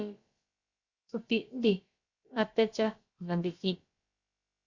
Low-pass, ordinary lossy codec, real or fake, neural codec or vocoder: 7.2 kHz; Opus, 64 kbps; fake; codec, 16 kHz, about 1 kbps, DyCAST, with the encoder's durations